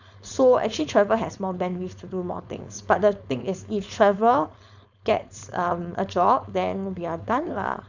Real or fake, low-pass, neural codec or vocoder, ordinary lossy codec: fake; 7.2 kHz; codec, 16 kHz, 4.8 kbps, FACodec; none